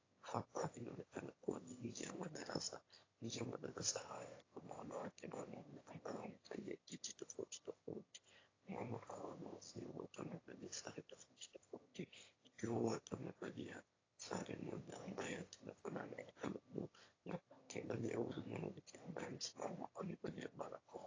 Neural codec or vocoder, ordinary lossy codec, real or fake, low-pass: autoencoder, 22.05 kHz, a latent of 192 numbers a frame, VITS, trained on one speaker; AAC, 32 kbps; fake; 7.2 kHz